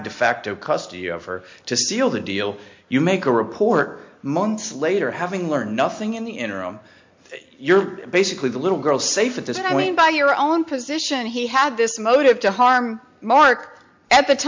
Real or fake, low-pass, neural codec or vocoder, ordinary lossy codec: real; 7.2 kHz; none; MP3, 48 kbps